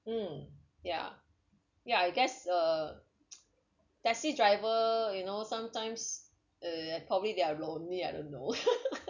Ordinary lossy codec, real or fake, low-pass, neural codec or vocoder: none; real; 7.2 kHz; none